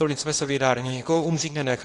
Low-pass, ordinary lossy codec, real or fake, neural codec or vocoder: 10.8 kHz; AAC, 48 kbps; fake; codec, 24 kHz, 0.9 kbps, WavTokenizer, small release